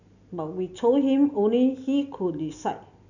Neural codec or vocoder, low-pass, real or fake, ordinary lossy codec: none; 7.2 kHz; real; none